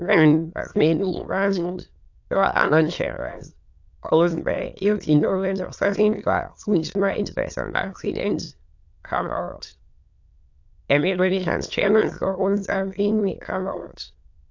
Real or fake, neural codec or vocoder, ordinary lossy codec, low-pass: fake; autoencoder, 22.05 kHz, a latent of 192 numbers a frame, VITS, trained on many speakers; AAC, 48 kbps; 7.2 kHz